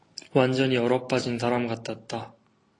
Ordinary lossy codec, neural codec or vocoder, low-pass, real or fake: AAC, 32 kbps; none; 10.8 kHz; real